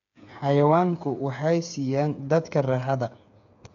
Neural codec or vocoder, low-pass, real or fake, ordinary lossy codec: codec, 16 kHz, 8 kbps, FreqCodec, smaller model; 7.2 kHz; fake; MP3, 64 kbps